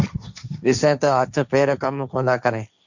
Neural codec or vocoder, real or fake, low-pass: codec, 16 kHz, 1.1 kbps, Voila-Tokenizer; fake; 7.2 kHz